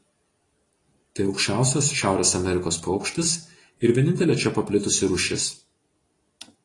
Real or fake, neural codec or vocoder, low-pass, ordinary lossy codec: real; none; 10.8 kHz; AAC, 32 kbps